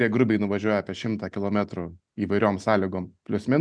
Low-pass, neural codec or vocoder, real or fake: 9.9 kHz; none; real